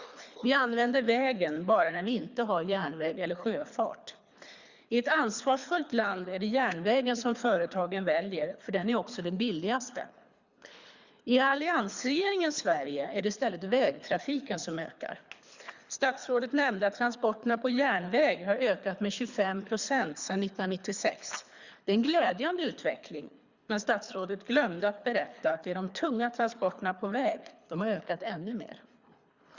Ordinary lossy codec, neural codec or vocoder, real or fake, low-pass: Opus, 64 kbps; codec, 24 kHz, 3 kbps, HILCodec; fake; 7.2 kHz